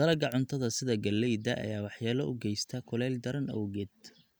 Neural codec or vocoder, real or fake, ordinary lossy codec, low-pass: none; real; none; none